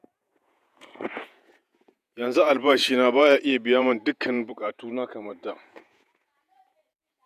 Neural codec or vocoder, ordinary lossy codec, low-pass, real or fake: vocoder, 48 kHz, 128 mel bands, Vocos; none; 14.4 kHz; fake